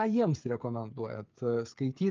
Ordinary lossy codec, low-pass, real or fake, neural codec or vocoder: Opus, 24 kbps; 7.2 kHz; fake; codec, 16 kHz, 8 kbps, FreqCodec, smaller model